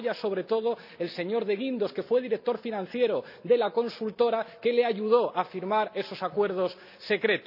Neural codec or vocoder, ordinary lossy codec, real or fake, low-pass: none; none; real; 5.4 kHz